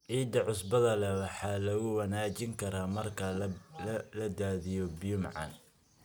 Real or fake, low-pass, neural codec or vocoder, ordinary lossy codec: real; none; none; none